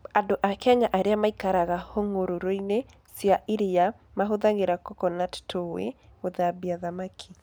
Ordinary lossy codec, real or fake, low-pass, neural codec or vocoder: none; real; none; none